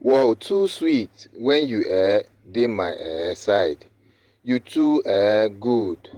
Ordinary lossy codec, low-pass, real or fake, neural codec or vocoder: Opus, 24 kbps; 19.8 kHz; fake; vocoder, 48 kHz, 128 mel bands, Vocos